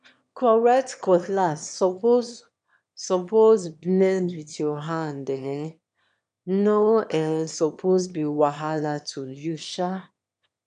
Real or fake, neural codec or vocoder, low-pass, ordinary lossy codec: fake; autoencoder, 22.05 kHz, a latent of 192 numbers a frame, VITS, trained on one speaker; 9.9 kHz; none